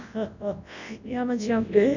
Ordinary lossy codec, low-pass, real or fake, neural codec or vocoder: none; 7.2 kHz; fake; codec, 24 kHz, 0.9 kbps, WavTokenizer, large speech release